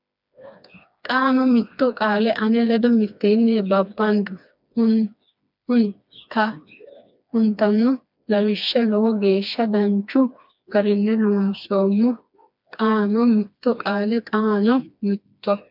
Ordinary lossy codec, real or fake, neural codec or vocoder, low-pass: MP3, 48 kbps; fake; codec, 16 kHz, 2 kbps, FreqCodec, smaller model; 5.4 kHz